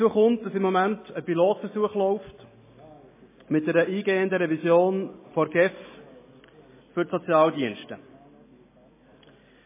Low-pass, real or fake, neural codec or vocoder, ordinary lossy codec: 3.6 kHz; real; none; MP3, 16 kbps